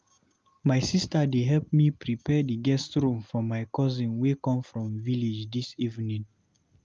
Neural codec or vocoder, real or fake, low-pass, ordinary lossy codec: none; real; 7.2 kHz; Opus, 32 kbps